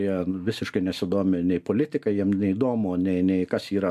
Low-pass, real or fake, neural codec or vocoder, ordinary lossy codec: 14.4 kHz; real; none; MP3, 96 kbps